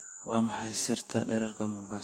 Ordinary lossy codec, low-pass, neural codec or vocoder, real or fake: MP3, 64 kbps; 19.8 kHz; codec, 44.1 kHz, 2.6 kbps, DAC; fake